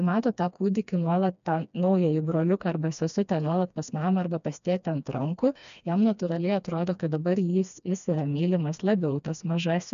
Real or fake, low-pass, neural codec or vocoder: fake; 7.2 kHz; codec, 16 kHz, 2 kbps, FreqCodec, smaller model